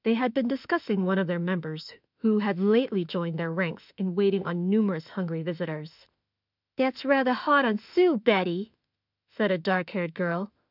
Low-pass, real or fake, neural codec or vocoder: 5.4 kHz; fake; autoencoder, 48 kHz, 32 numbers a frame, DAC-VAE, trained on Japanese speech